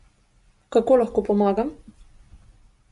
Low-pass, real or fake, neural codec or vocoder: 10.8 kHz; real; none